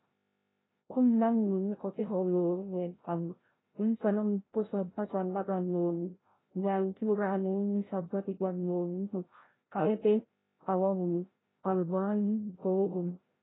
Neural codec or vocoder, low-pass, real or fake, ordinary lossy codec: codec, 16 kHz, 0.5 kbps, FreqCodec, larger model; 7.2 kHz; fake; AAC, 16 kbps